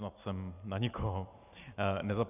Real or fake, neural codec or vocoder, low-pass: real; none; 3.6 kHz